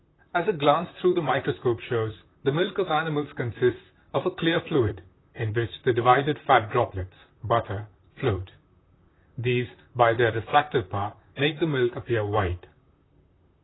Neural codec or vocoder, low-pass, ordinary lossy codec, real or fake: vocoder, 44.1 kHz, 128 mel bands, Pupu-Vocoder; 7.2 kHz; AAC, 16 kbps; fake